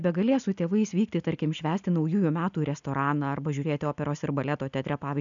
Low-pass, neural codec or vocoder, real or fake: 7.2 kHz; none; real